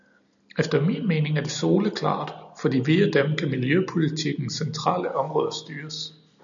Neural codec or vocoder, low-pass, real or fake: none; 7.2 kHz; real